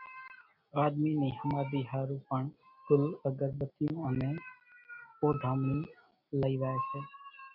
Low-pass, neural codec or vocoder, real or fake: 5.4 kHz; none; real